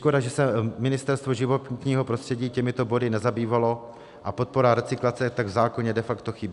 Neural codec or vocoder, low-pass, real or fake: none; 10.8 kHz; real